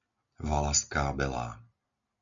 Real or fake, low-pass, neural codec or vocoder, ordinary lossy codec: real; 7.2 kHz; none; MP3, 64 kbps